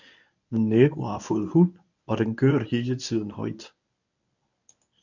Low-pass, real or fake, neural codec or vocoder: 7.2 kHz; fake; codec, 24 kHz, 0.9 kbps, WavTokenizer, medium speech release version 1